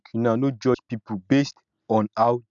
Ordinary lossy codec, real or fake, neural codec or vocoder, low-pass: none; real; none; 7.2 kHz